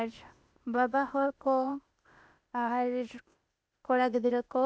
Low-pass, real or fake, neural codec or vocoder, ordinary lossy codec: none; fake; codec, 16 kHz, 0.8 kbps, ZipCodec; none